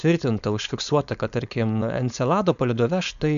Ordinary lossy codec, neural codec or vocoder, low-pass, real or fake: MP3, 96 kbps; codec, 16 kHz, 4.8 kbps, FACodec; 7.2 kHz; fake